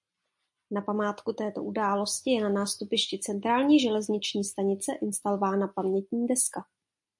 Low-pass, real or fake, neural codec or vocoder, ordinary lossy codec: 10.8 kHz; real; none; MP3, 48 kbps